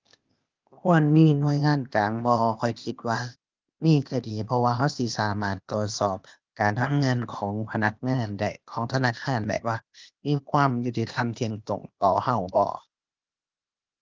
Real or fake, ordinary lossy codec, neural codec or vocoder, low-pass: fake; Opus, 24 kbps; codec, 16 kHz, 0.8 kbps, ZipCodec; 7.2 kHz